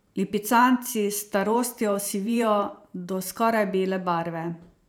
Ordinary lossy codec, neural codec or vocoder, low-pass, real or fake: none; vocoder, 44.1 kHz, 128 mel bands every 512 samples, BigVGAN v2; none; fake